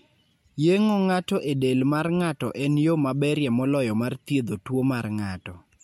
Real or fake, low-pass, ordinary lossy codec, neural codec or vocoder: real; 19.8 kHz; MP3, 64 kbps; none